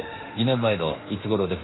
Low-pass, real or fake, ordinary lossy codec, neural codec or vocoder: 7.2 kHz; fake; AAC, 16 kbps; autoencoder, 48 kHz, 32 numbers a frame, DAC-VAE, trained on Japanese speech